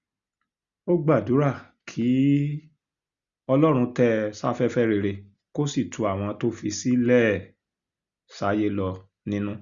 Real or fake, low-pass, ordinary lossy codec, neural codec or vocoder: real; 7.2 kHz; Opus, 64 kbps; none